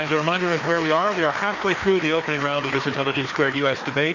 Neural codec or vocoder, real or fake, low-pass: codec, 16 kHz, 2 kbps, FreqCodec, larger model; fake; 7.2 kHz